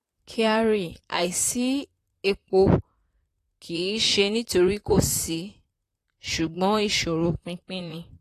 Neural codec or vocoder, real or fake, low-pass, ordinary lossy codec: vocoder, 44.1 kHz, 128 mel bands, Pupu-Vocoder; fake; 14.4 kHz; AAC, 48 kbps